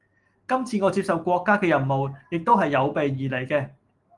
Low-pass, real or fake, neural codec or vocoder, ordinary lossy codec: 10.8 kHz; real; none; Opus, 24 kbps